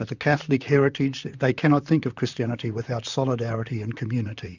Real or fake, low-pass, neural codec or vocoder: fake; 7.2 kHz; vocoder, 44.1 kHz, 128 mel bands, Pupu-Vocoder